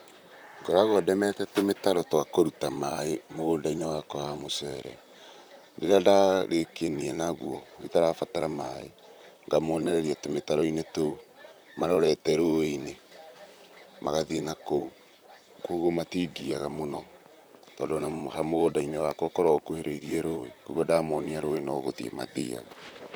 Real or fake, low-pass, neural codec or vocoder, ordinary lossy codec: fake; none; vocoder, 44.1 kHz, 128 mel bands, Pupu-Vocoder; none